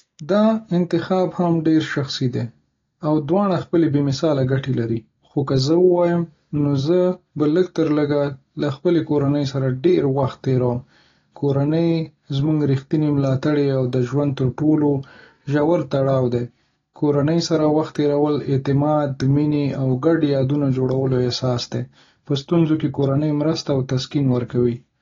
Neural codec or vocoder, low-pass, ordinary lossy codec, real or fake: none; 7.2 kHz; AAC, 32 kbps; real